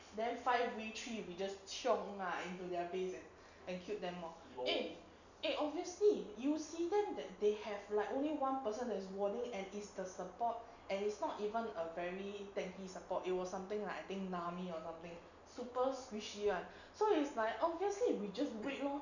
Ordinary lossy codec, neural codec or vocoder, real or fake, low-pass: none; none; real; 7.2 kHz